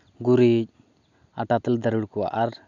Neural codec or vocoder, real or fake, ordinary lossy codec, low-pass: none; real; none; 7.2 kHz